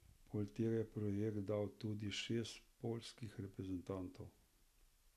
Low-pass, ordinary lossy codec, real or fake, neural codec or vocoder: 14.4 kHz; none; real; none